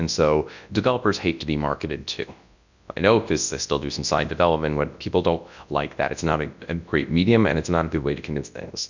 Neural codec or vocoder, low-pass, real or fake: codec, 24 kHz, 0.9 kbps, WavTokenizer, large speech release; 7.2 kHz; fake